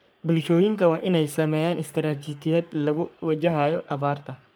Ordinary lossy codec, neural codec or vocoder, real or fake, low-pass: none; codec, 44.1 kHz, 3.4 kbps, Pupu-Codec; fake; none